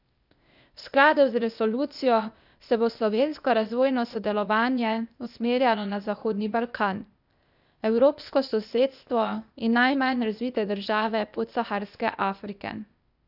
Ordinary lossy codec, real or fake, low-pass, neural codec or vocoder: none; fake; 5.4 kHz; codec, 16 kHz, 0.8 kbps, ZipCodec